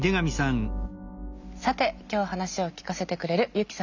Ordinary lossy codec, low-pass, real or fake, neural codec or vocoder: none; 7.2 kHz; real; none